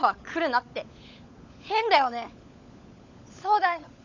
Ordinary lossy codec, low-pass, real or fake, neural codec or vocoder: none; 7.2 kHz; fake; codec, 16 kHz, 16 kbps, FunCodec, trained on LibriTTS, 50 frames a second